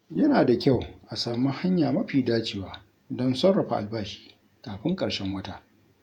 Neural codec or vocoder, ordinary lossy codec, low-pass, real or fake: vocoder, 48 kHz, 128 mel bands, Vocos; none; 19.8 kHz; fake